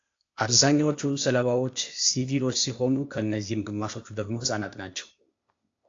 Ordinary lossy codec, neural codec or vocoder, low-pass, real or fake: AAC, 48 kbps; codec, 16 kHz, 0.8 kbps, ZipCodec; 7.2 kHz; fake